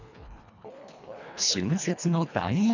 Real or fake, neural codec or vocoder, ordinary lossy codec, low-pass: fake; codec, 24 kHz, 1.5 kbps, HILCodec; none; 7.2 kHz